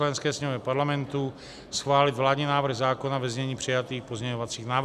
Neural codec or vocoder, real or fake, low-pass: none; real; 14.4 kHz